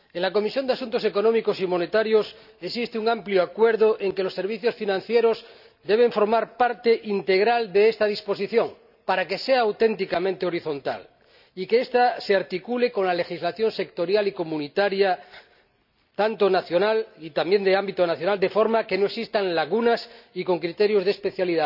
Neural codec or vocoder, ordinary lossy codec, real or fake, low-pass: none; none; real; 5.4 kHz